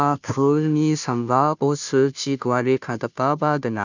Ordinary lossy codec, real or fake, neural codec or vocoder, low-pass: none; fake; codec, 16 kHz, 0.5 kbps, FunCodec, trained on Chinese and English, 25 frames a second; 7.2 kHz